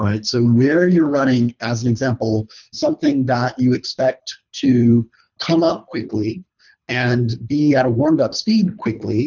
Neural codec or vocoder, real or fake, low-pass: codec, 24 kHz, 3 kbps, HILCodec; fake; 7.2 kHz